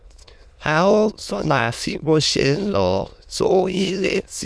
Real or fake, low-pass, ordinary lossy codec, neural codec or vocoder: fake; none; none; autoencoder, 22.05 kHz, a latent of 192 numbers a frame, VITS, trained on many speakers